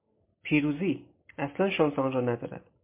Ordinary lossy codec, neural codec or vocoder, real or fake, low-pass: MP3, 24 kbps; none; real; 3.6 kHz